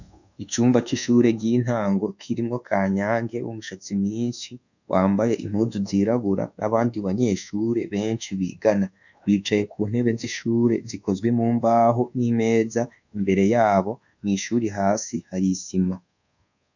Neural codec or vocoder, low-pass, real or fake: codec, 24 kHz, 1.2 kbps, DualCodec; 7.2 kHz; fake